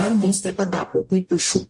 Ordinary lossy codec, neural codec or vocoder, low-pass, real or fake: MP3, 48 kbps; codec, 44.1 kHz, 0.9 kbps, DAC; 10.8 kHz; fake